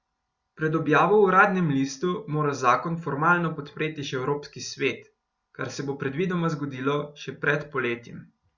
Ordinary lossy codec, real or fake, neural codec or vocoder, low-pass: Opus, 64 kbps; real; none; 7.2 kHz